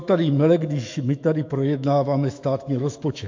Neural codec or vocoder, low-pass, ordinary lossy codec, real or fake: autoencoder, 48 kHz, 128 numbers a frame, DAC-VAE, trained on Japanese speech; 7.2 kHz; MP3, 48 kbps; fake